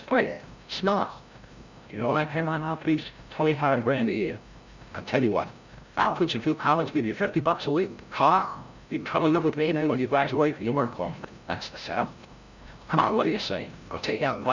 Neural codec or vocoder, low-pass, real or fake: codec, 16 kHz, 0.5 kbps, FreqCodec, larger model; 7.2 kHz; fake